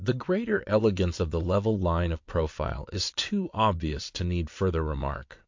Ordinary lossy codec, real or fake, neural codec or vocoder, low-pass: MP3, 48 kbps; fake; vocoder, 22.05 kHz, 80 mel bands, WaveNeXt; 7.2 kHz